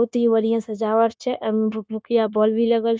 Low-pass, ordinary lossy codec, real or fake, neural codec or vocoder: none; none; fake; codec, 16 kHz, 0.9 kbps, LongCat-Audio-Codec